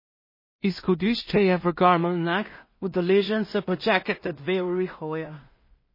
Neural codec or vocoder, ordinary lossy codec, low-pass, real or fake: codec, 16 kHz in and 24 kHz out, 0.4 kbps, LongCat-Audio-Codec, two codebook decoder; MP3, 24 kbps; 5.4 kHz; fake